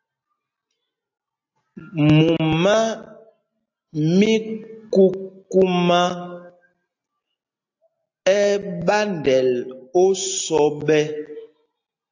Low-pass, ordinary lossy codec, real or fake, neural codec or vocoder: 7.2 kHz; AAC, 48 kbps; real; none